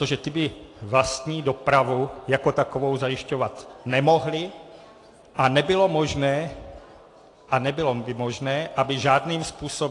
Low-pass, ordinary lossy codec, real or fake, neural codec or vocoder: 10.8 kHz; AAC, 48 kbps; real; none